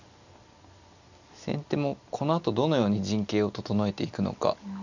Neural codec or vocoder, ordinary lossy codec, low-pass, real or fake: none; none; 7.2 kHz; real